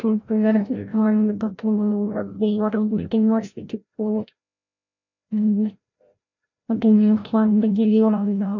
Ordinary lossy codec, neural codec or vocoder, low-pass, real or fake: none; codec, 16 kHz, 0.5 kbps, FreqCodec, larger model; 7.2 kHz; fake